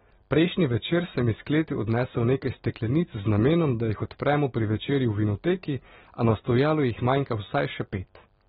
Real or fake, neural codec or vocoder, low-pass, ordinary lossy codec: real; none; 9.9 kHz; AAC, 16 kbps